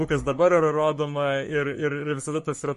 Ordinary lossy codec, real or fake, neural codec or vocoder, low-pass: MP3, 48 kbps; fake; codec, 44.1 kHz, 3.4 kbps, Pupu-Codec; 14.4 kHz